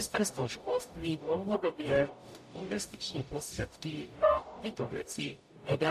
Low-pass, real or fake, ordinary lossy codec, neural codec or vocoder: 14.4 kHz; fake; MP3, 64 kbps; codec, 44.1 kHz, 0.9 kbps, DAC